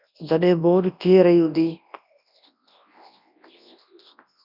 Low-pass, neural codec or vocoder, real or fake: 5.4 kHz; codec, 24 kHz, 0.9 kbps, WavTokenizer, large speech release; fake